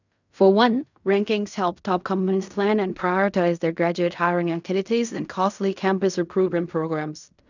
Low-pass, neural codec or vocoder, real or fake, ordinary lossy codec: 7.2 kHz; codec, 16 kHz in and 24 kHz out, 0.4 kbps, LongCat-Audio-Codec, fine tuned four codebook decoder; fake; none